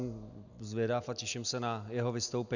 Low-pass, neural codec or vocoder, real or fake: 7.2 kHz; none; real